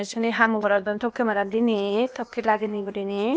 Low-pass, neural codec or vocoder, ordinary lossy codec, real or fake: none; codec, 16 kHz, 0.8 kbps, ZipCodec; none; fake